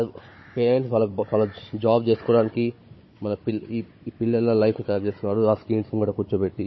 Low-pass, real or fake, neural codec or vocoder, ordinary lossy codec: 7.2 kHz; fake; codec, 16 kHz, 16 kbps, FunCodec, trained on Chinese and English, 50 frames a second; MP3, 24 kbps